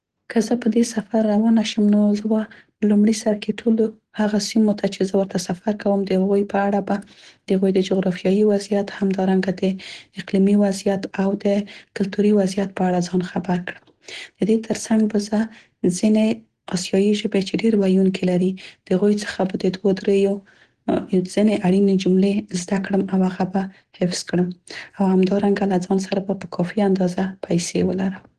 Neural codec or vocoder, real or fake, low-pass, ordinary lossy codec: none; real; 9.9 kHz; Opus, 16 kbps